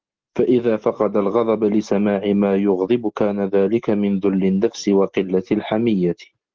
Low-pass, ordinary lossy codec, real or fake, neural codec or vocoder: 7.2 kHz; Opus, 16 kbps; real; none